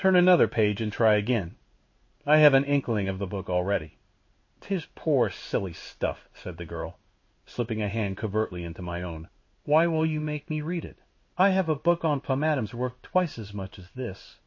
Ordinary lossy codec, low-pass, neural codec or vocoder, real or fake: MP3, 32 kbps; 7.2 kHz; codec, 16 kHz in and 24 kHz out, 1 kbps, XY-Tokenizer; fake